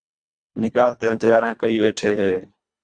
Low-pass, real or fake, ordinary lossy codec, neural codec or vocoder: 9.9 kHz; fake; Opus, 64 kbps; codec, 24 kHz, 1.5 kbps, HILCodec